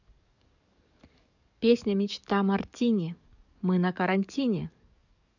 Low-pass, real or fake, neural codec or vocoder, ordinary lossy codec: 7.2 kHz; fake; codec, 44.1 kHz, 7.8 kbps, DAC; none